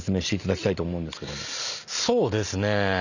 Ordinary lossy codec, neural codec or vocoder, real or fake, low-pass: none; none; real; 7.2 kHz